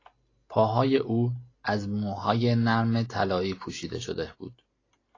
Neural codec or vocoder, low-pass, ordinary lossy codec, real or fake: none; 7.2 kHz; AAC, 32 kbps; real